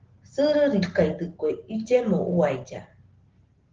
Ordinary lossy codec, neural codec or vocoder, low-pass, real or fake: Opus, 16 kbps; none; 7.2 kHz; real